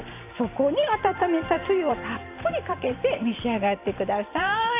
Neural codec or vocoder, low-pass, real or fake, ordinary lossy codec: vocoder, 44.1 kHz, 128 mel bands every 512 samples, BigVGAN v2; 3.6 kHz; fake; AAC, 24 kbps